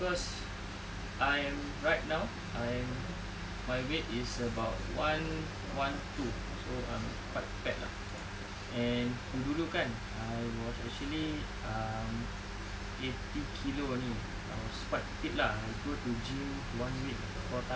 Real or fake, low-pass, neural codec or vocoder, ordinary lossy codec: real; none; none; none